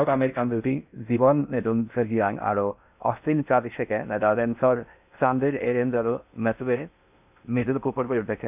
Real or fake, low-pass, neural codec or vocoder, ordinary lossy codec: fake; 3.6 kHz; codec, 16 kHz in and 24 kHz out, 0.6 kbps, FocalCodec, streaming, 2048 codes; none